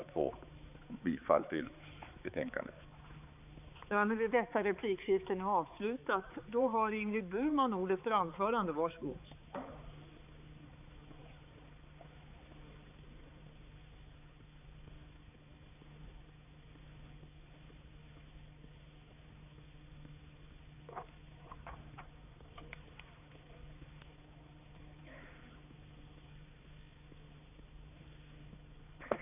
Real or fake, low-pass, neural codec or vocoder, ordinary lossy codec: fake; 3.6 kHz; codec, 16 kHz, 4 kbps, X-Codec, HuBERT features, trained on balanced general audio; none